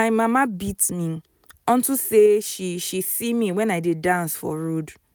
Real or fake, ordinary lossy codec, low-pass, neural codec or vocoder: real; none; none; none